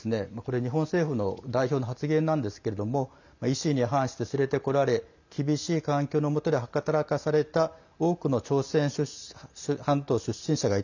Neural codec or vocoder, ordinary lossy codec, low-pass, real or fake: none; none; 7.2 kHz; real